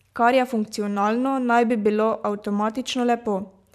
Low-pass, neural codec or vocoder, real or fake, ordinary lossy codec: 14.4 kHz; vocoder, 44.1 kHz, 128 mel bands every 512 samples, BigVGAN v2; fake; none